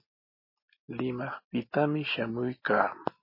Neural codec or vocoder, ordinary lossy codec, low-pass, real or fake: none; MP3, 24 kbps; 5.4 kHz; real